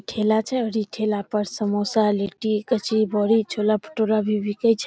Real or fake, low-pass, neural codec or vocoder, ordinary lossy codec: real; none; none; none